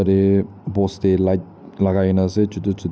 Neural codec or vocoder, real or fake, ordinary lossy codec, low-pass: none; real; none; none